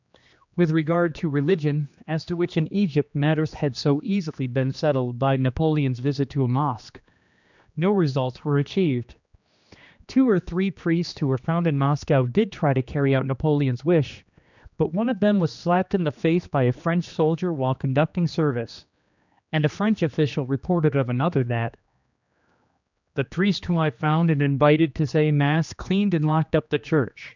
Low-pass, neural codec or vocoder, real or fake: 7.2 kHz; codec, 16 kHz, 2 kbps, X-Codec, HuBERT features, trained on general audio; fake